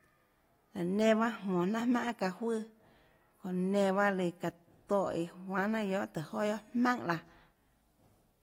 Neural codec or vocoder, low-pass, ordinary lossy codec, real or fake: none; 19.8 kHz; AAC, 48 kbps; real